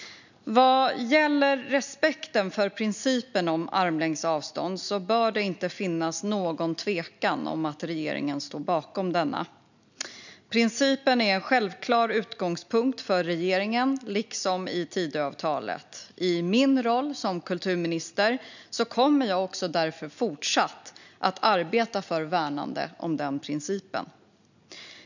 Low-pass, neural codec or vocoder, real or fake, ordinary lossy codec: 7.2 kHz; none; real; none